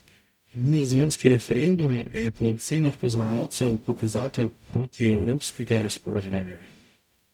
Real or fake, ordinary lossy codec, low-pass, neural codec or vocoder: fake; none; 19.8 kHz; codec, 44.1 kHz, 0.9 kbps, DAC